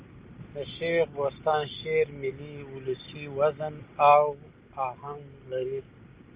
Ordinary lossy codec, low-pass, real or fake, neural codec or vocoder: Opus, 32 kbps; 3.6 kHz; real; none